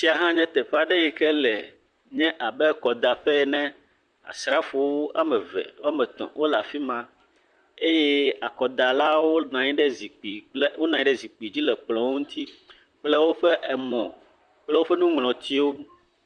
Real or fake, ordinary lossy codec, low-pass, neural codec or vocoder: fake; Opus, 64 kbps; 9.9 kHz; vocoder, 44.1 kHz, 128 mel bands, Pupu-Vocoder